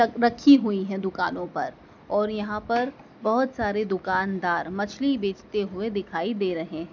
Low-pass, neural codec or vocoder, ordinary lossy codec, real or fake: 7.2 kHz; none; none; real